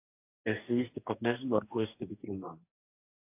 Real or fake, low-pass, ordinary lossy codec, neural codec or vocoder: fake; 3.6 kHz; AAC, 32 kbps; codec, 44.1 kHz, 2.6 kbps, DAC